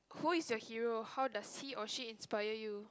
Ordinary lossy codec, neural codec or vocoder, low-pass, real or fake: none; none; none; real